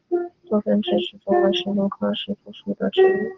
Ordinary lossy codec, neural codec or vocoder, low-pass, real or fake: Opus, 16 kbps; none; 7.2 kHz; real